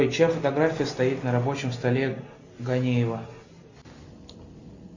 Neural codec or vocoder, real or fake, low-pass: none; real; 7.2 kHz